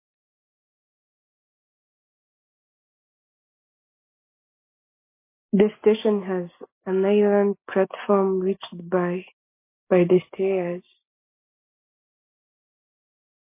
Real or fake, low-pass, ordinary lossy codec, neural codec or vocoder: real; 3.6 kHz; MP3, 24 kbps; none